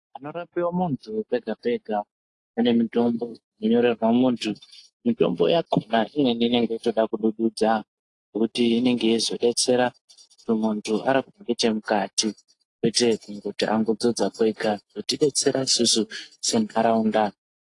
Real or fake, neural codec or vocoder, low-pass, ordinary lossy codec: real; none; 10.8 kHz; AAC, 48 kbps